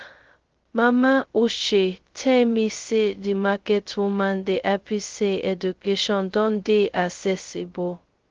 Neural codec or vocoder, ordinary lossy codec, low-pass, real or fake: codec, 16 kHz, 0.2 kbps, FocalCodec; Opus, 16 kbps; 7.2 kHz; fake